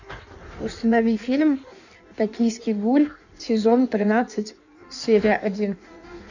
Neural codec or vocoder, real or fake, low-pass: codec, 16 kHz in and 24 kHz out, 1.1 kbps, FireRedTTS-2 codec; fake; 7.2 kHz